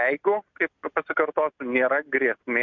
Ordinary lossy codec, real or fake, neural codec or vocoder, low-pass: MP3, 64 kbps; real; none; 7.2 kHz